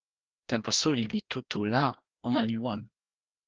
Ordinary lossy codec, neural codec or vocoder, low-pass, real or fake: Opus, 16 kbps; codec, 16 kHz, 1 kbps, FreqCodec, larger model; 7.2 kHz; fake